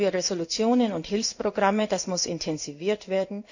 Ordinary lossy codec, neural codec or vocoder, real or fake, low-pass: none; codec, 16 kHz in and 24 kHz out, 1 kbps, XY-Tokenizer; fake; 7.2 kHz